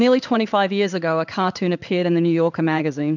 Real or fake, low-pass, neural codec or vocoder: fake; 7.2 kHz; codec, 16 kHz in and 24 kHz out, 1 kbps, XY-Tokenizer